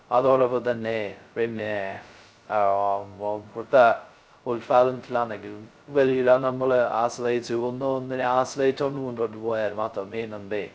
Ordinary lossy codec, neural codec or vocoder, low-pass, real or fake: none; codec, 16 kHz, 0.2 kbps, FocalCodec; none; fake